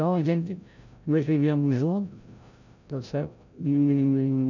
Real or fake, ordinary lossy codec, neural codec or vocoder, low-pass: fake; none; codec, 16 kHz, 0.5 kbps, FreqCodec, larger model; 7.2 kHz